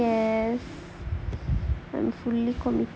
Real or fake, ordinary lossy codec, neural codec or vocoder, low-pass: real; none; none; none